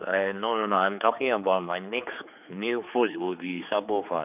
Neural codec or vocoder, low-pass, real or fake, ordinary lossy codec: codec, 16 kHz, 4 kbps, X-Codec, HuBERT features, trained on general audio; 3.6 kHz; fake; none